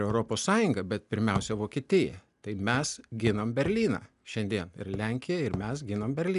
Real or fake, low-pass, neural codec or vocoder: real; 10.8 kHz; none